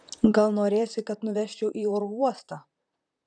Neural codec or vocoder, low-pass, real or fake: vocoder, 44.1 kHz, 128 mel bands, Pupu-Vocoder; 9.9 kHz; fake